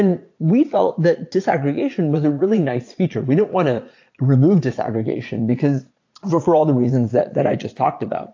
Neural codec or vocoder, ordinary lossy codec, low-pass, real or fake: vocoder, 22.05 kHz, 80 mel bands, Vocos; AAC, 48 kbps; 7.2 kHz; fake